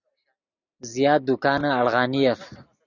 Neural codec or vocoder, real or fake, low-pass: none; real; 7.2 kHz